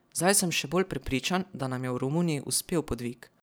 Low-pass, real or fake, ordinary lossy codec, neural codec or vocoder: none; fake; none; vocoder, 44.1 kHz, 128 mel bands every 512 samples, BigVGAN v2